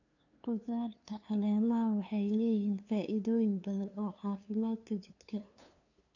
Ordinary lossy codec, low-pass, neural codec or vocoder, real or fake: none; 7.2 kHz; codec, 16 kHz, 2 kbps, FunCodec, trained on LibriTTS, 25 frames a second; fake